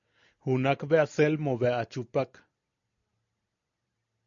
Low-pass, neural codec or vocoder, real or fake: 7.2 kHz; none; real